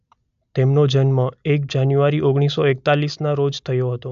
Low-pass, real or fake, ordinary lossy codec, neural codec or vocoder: 7.2 kHz; real; AAC, 96 kbps; none